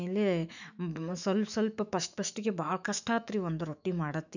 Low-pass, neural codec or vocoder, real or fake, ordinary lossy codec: 7.2 kHz; autoencoder, 48 kHz, 128 numbers a frame, DAC-VAE, trained on Japanese speech; fake; none